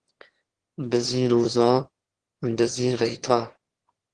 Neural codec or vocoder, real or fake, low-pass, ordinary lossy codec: autoencoder, 22.05 kHz, a latent of 192 numbers a frame, VITS, trained on one speaker; fake; 9.9 kHz; Opus, 16 kbps